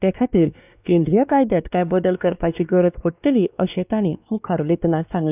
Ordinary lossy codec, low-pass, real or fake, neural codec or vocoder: none; 3.6 kHz; fake; codec, 16 kHz, 2 kbps, X-Codec, WavLM features, trained on Multilingual LibriSpeech